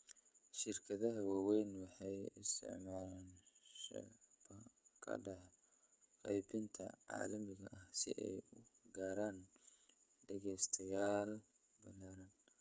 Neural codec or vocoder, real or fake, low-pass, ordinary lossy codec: codec, 16 kHz, 16 kbps, FreqCodec, smaller model; fake; none; none